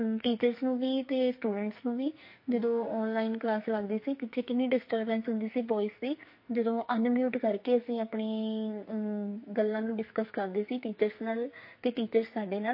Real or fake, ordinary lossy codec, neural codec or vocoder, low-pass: fake; MP3, 32 kbps; codec, 44.1 kHz, 2.6 kbps, SNAC; 5.4 kHz